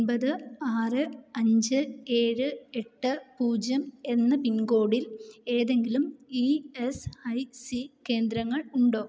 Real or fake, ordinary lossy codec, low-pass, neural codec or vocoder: real; none; none; none